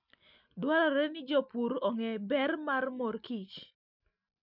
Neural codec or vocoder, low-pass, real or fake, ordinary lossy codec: none; 5.4 kHz; real; none